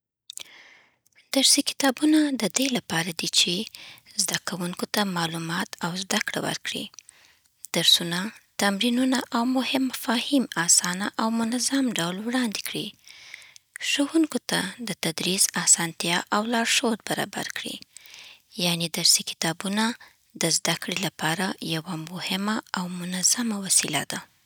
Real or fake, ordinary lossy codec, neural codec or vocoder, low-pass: real; none; none; none